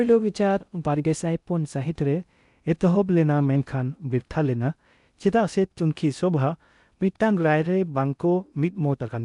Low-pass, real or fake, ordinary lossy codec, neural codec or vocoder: 10.8 kHz; fake; none; codec, 16 kHz in and 24 kHz out, 0.6 kbps, FocalCodec, streaming, 2048 codes